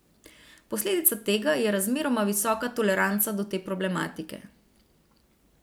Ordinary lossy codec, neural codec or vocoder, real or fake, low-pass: none; none; real; none